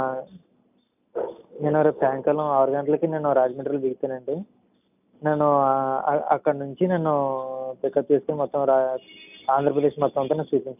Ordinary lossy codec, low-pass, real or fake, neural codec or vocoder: none; 3.6 kHz; real; none